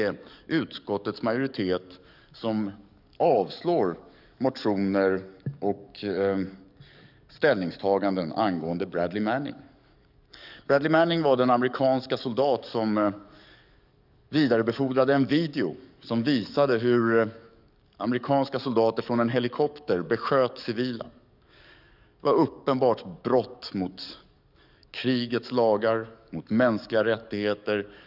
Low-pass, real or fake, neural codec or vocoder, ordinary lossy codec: 5.4 kHz; fake; codec, 44.1 kHz, 7.8 kbps, DAC; none